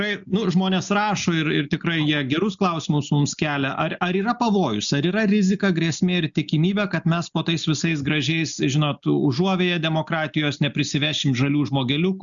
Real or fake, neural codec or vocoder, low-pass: real; none; 7.2 kHz